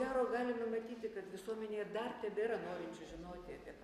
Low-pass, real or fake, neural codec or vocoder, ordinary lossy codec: 14.4 kHz; real; none; Opus, 64 kbps